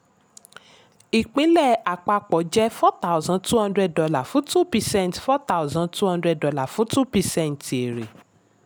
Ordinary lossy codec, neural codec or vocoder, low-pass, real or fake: none; none; none; real